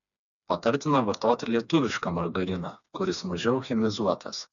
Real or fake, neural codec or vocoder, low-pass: fake; codec, 16 kHz, 2 kbps, FreqCodec, smaller model; 7.2 kHz